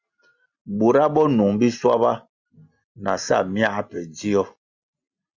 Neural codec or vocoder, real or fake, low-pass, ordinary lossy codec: none; real; 7.2 kHz; Opus, 64 kbps